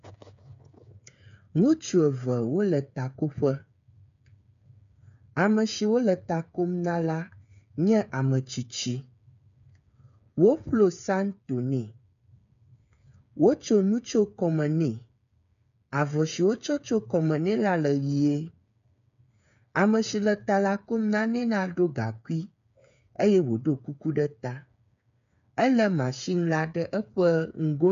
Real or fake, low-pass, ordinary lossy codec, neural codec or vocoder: fake; 7.2 kHz; MP3, 96 kbps; codec, 16 kHz, 8 kbps, FreqCodec, smaller model